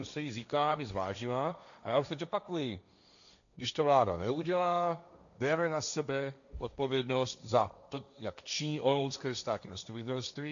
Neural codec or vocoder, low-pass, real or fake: codec, 16 kHz, 1.1 kbps, Voila-Tokenizer; 7.2 kHz; fake